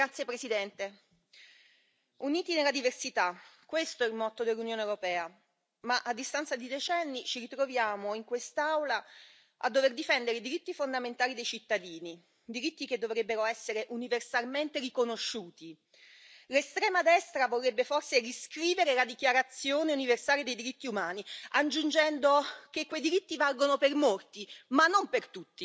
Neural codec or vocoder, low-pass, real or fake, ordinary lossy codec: none; none; real; none